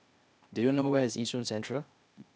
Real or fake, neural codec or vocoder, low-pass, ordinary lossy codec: fake; codec, 16 kHz, 0.8 kbps, ZipCodec; none; none